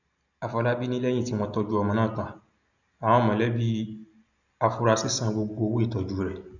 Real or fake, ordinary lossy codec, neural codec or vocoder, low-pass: real; none; none; 7.2 kHz